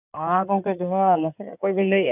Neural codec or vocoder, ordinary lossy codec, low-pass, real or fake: codec, 16 kHz in and 24 kHz out, 2.2 kbps, FireRedTTS-2 codec; none; 3.6 kHz; fake